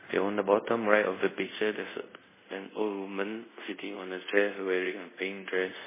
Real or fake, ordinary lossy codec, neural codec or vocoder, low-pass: fake; MP3, 16 kbps; codec, 24 kHz, 0.5 kbps, DualCodec; 3.6 kHz